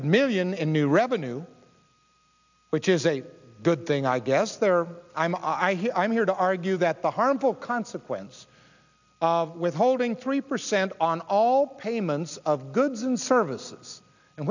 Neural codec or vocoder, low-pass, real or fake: none; 7.2 kHz; real